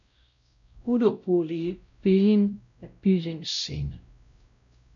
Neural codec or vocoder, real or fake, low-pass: codec, 16 kHz, 0.5 kbps, X-Codec, WavLM features, trained on Multilingual LibriSpeech; fake; 7.2 kHz